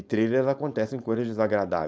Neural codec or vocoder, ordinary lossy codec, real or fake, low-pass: codec, 16 kHz, 4.8 kbps, FACodec; none; fake; none